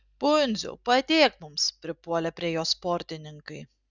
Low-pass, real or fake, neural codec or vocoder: 7.2 kHz; real; none